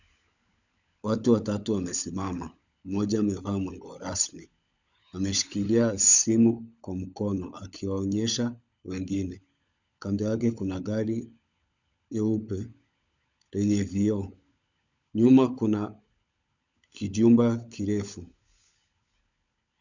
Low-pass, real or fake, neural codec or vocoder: 7.2 kHz; fake; codec, 16 kHz, 16 kbps, FunCodec, trained on LibriTTS, 50 frames a second